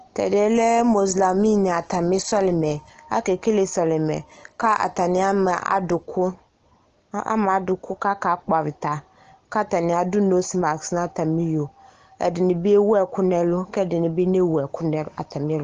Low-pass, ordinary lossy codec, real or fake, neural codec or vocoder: 7.2 kHz; Opus, 16 kbps; real; none